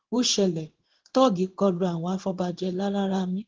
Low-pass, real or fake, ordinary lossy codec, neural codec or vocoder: 7.2 kHz; fake; Opus, 16 kbps; codec, 16 kHz in and 24 kHz out, 1 kbps, XY-Tokenizer